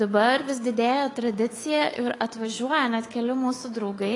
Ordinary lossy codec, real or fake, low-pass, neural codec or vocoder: AAC, 32 kbps; fake; 10.8 kHz; codec, 24 kHz, 3.1 kbps, DualCodec